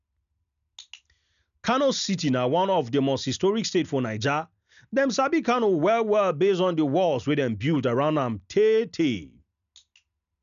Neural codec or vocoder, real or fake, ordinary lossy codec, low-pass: none; real; none; 7.2 kHz